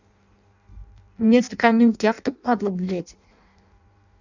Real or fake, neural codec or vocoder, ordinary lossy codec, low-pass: fake; codec, 16 kHz in and 24 kHz out, 0.6 kbps, FireRedTTS-2 codec; none; 7.2 kHz